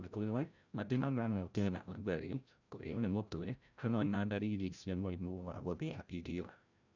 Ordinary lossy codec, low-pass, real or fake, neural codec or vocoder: none; 7.2 kHz; fake; codec, 16 kHz, 0.5 kbps, FreqCodec, larger model